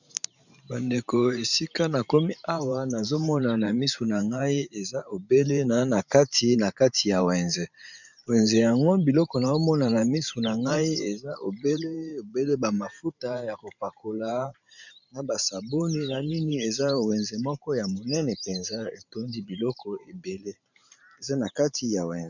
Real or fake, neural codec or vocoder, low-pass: fake; vocoder, 44.1 kHz, 128 mel bands every 512 samples, BigVGAN v2; 7.2 kHz